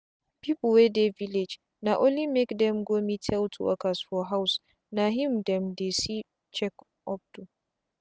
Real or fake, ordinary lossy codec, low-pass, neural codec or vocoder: real; none; none; none